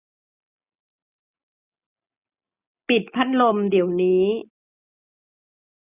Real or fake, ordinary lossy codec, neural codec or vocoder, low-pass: real; none; none; 3.6 kHz